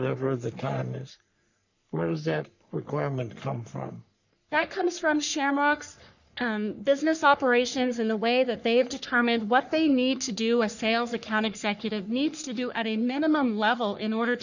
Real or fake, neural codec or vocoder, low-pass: fake; codec, 44.1 kHz, 3.4 kbps, Pupu-Codec; 7.2 kHz